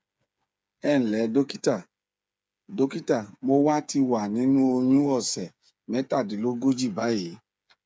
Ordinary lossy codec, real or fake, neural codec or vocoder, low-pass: none; fake; codec, 16 kHz, 8 kbps, FreqCodec, smaller model; none